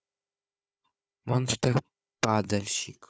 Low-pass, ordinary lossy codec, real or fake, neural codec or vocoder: none; none; fake; codec, 16 kHz, 4 kbps, FunCodec, trained on Chinese and English, 50 frames a second